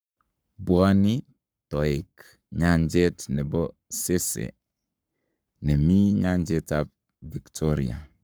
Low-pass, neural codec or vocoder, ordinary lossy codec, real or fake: none; codec, 44.1 kHz, 7.8 kbps, Pupu-Codec; none; fake